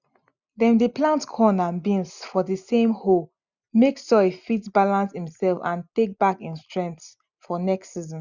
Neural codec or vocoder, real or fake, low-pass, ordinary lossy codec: none; real; 7.2 kHz; none